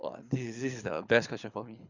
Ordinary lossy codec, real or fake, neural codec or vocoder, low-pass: Opus, 64 kbps; fake; codec, 24 kHz, 6 kbps, HILCodec; 7.2 kHz